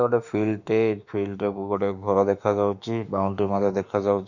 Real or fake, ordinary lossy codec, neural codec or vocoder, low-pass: fake; none; autoencoder, 48 kHz, 32 numbers a frame, DAC-VAE, trained on Japanese speech; 7.2 kHz